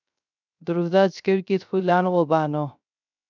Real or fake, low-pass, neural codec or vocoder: fake; 7.2 kHz; codec, 16 kHz, 0.3 kbps, FocalCodec